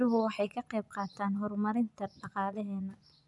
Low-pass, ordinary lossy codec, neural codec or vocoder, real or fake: 10.8 kHz; none; vocoder, 24 kHz, 100 mel bands, Vocos; fake